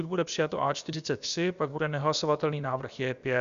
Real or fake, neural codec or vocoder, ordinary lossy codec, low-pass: fake; codec, 16 kHz, about 1 kbps, DyCAST, with the encoder's durations; Opus, 64 kbps; 7.2 kHz